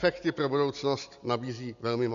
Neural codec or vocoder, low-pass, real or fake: none; 7.2 kHz; real